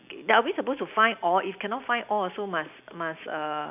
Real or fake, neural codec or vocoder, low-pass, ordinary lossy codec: real; none; 3.6 kHz; none